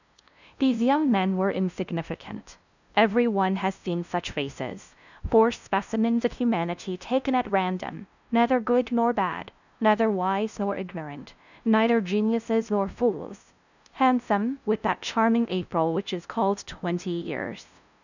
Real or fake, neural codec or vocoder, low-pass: fake; codec, 16 kHz, 0.5 kbps, FunCodec, trained on LibriTTS, 25 frames a second; 7.2 kHz